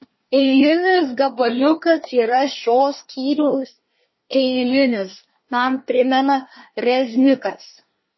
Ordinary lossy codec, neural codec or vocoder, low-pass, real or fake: MP3, 24 kbps; codec, 24 kHz, 1 kbps, SNAC; 7.2 kHz; fake